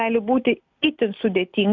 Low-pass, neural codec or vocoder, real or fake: 7.2 kHz; none; real